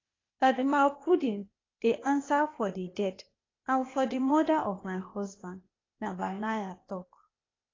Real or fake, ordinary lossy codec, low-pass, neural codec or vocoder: fake; AAC, 32 kbps; 7.2 kHz; codec, 16 kHz, 0.8 kbps, ZipCodec